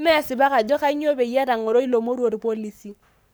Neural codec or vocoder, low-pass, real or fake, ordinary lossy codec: codec, 44.1 kHz, 7.8 kbps, Pupu-Codec; none; fake; none